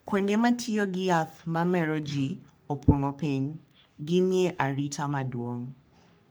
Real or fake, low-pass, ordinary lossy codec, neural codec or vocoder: fake; none; none; codec, 44.1 kHz, 3.4 kbps, Pupu-Codec